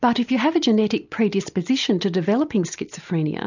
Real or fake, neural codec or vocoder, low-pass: real; none; 7.2 kHz